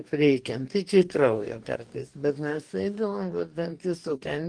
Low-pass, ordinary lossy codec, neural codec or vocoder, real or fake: 9.9 kHz; Opus, 24 kbps; codec, 44.1 kHz, 2.6 kbps, DAC; fake